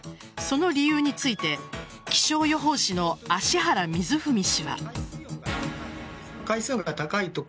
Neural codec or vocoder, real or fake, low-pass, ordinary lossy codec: none; real; none; none